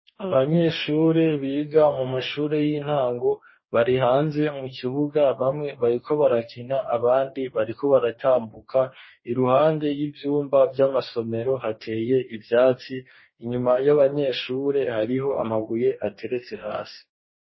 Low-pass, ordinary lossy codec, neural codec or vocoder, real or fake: 7.2 kHz; MP3, 24 kbps; codec, 44.1 kHz, 2.6 kbps, DAC; fake